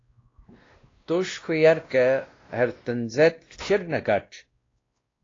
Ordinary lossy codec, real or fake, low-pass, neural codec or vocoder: AAC, 32 kbps; fake; 7.2 kHz; codec, 16 kHz, 1 kbps, X-Codec, WavLM features, trained on Multilingual LibriSpeech